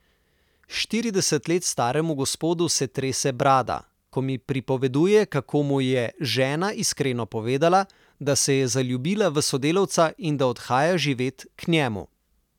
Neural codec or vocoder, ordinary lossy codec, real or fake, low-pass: none; none; real; 19.8 kHz